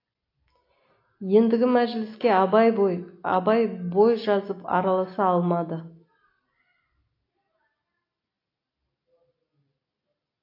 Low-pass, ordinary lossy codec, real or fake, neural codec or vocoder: 5.4 kHz; AAC, 32 kbps; real; none